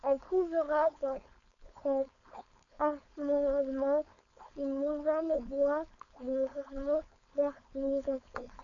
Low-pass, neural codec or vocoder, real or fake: 7.2 kHz; codec, 16 kHz, 4.8 kbps, FACodec; fake